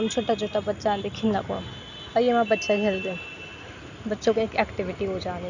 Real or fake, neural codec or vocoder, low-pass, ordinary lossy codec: real; none; 7.2 kHz; none